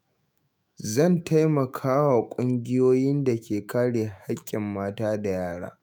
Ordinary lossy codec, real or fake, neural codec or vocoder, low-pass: none; fake; autoencoder, 48 kHz, 128 numbers a frame, DAC-VAE, trained on Japanese speech; none